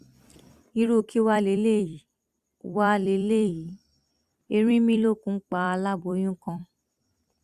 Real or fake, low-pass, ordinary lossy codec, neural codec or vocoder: fake; 14.4 kHz; Opus, 64 kbps; vocoder, 44.1 kHz, 128 mel bands every 256 samples, BigVGAN v2